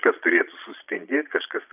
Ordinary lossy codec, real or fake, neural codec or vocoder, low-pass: AAC, 32 kbps; fake; vocoder, 22.05 kHz, 80 mel bands, Vocos; 3.6 kHz